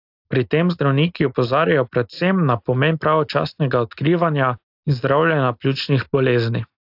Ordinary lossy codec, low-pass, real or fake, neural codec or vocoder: AAC, 48 kbps; 5.4 kHz; real; none